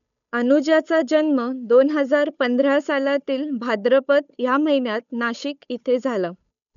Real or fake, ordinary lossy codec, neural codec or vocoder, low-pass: fake; none; codec, 16 kHz, 8 kbps, FunCodec, trained on Chinese and English, 25 frames a second; 7.2 kHz